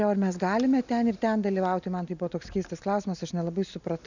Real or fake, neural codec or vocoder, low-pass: real; none; 7.2 kHz